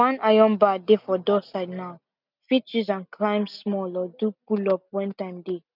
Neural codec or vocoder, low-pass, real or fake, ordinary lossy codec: none; 5.4 kHz; real; none